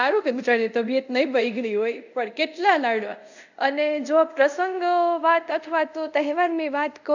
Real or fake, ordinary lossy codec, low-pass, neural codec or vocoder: fake; none; 7.2 kHz; codec, 24 kHz, 0.5 kbps, DualCodec